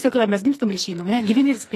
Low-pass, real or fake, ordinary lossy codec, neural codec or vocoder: 14.4 kHz; fake; AAC, 48 kbps; codec, 44.1 kHz, 2.6 kbps, SNAC